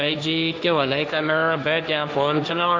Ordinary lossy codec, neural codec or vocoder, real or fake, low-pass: none; codec, 16 kHz, 1.1 kbps, Voila-Tokenizer; fake; none